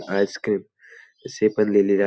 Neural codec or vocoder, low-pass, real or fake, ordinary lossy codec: none; none; real; none